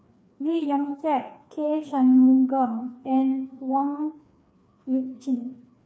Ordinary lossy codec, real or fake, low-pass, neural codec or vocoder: none; fake; none; codec, 16 kHz, 2 kbps, FreqCodec, larger model